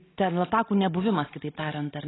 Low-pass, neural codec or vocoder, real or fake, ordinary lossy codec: 7.2 kHz; none; real; AAC, 16 kbps